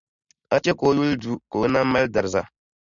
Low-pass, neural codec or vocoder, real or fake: 7.2 kHz; none; real